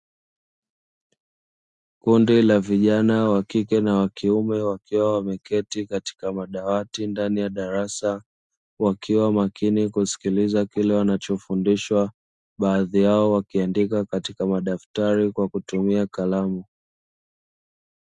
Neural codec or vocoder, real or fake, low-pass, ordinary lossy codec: none; real; 10.8 kHz; Opus, 64 kbps